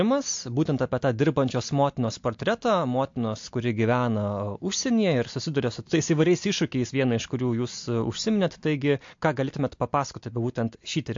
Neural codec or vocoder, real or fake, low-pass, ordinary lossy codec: none; real; 7.2 kHz; MP3, 48 kbps